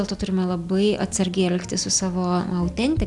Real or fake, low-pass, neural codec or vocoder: real; 10.8 kHz; none